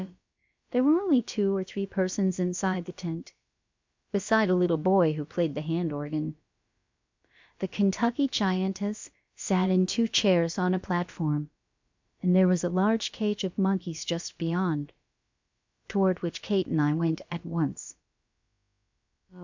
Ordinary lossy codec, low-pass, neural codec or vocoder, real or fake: MP3, 64 kbps; 7.2 kHz; codec, 16 kHz, about 1 kbps, DyCAST, with the encoder's durations; fake